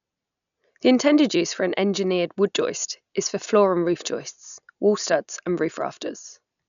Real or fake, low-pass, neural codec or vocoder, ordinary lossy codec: real; 7.2 kHz; none; none